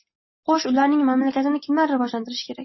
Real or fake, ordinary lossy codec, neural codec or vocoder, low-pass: real; MP3, 24 kbps; none; 7.2 kHz